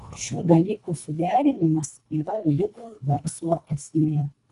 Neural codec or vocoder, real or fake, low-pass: codec, 24 kHz, 1.5 kbps, HILCodec; fake; 10.8 kHz